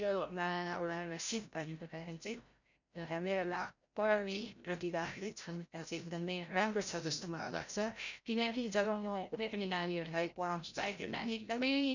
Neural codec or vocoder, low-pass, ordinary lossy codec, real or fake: codec, 16 kHz, 0.5 kbps, FreqCodec, larger model; 7.2 kHz; none; fake